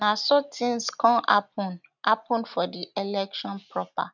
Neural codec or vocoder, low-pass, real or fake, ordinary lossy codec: none; 7.2 kHz; real; none